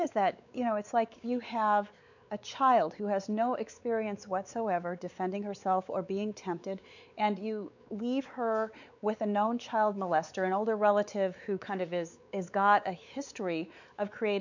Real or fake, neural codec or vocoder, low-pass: fake; codec, 16 kHz, 4 kbps, X-Codec, WavLM features, trained on Multilingual LibriSpeech; 7.2 kHz